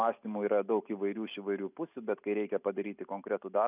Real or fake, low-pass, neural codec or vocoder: real; 3.6 kHz; none